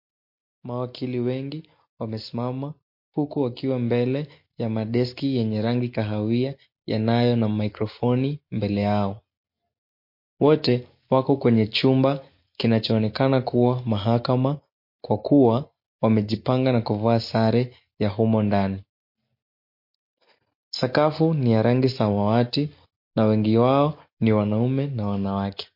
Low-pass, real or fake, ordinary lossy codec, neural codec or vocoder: 5.4 kHz; real; MP3, 32 kbps; none